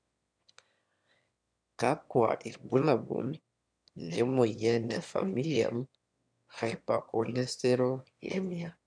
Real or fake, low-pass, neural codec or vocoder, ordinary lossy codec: fake; 9.9 kHz; autoencoder, 22.05 kHz, a latent of 192 numbers a frame, VITS, trained on one speaker; none